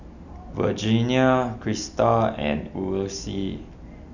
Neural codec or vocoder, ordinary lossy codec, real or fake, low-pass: none; none; real; 7.2 kHz